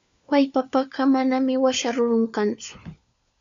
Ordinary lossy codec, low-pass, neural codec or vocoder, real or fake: MP3, 64 kbps; 7.2 kHz; codec, 16 kHz, 4 kbps, FunCodec, trained on LibriTTS, 50 frames a second; fake